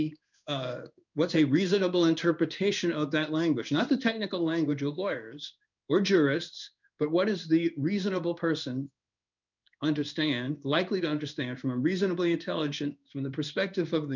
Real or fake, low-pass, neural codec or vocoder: fake; 7.2 kHz; codec, 16 kHz in and 24 kHz out, 1 kbps, XY-Tokenizer